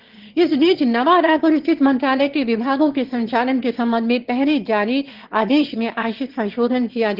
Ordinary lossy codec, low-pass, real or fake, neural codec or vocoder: Opus, 16 kbps; 5.4 kHz; fake; autoencoder, 22.05 kHz, a latent of 192 numbers a frame, VITS, trained on one speaker